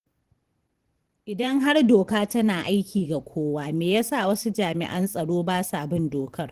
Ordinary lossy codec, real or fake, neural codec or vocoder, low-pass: Opus, 16 kbps; fake; vocoder, 44.1 kHz, 128 mel bands every 512 samples, BigVGAN v2; 14.4 kHz